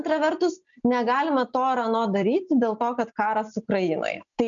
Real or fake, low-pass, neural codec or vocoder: real; 7.2 kHz; none